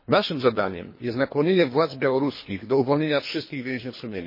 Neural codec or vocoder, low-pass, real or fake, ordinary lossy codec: codec, 24 kHz, 3 kbps, HILCodec; 5.4 kHz; fake; MP3, 24 kbps